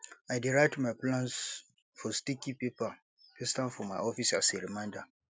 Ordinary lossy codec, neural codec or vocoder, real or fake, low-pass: none; none; real; none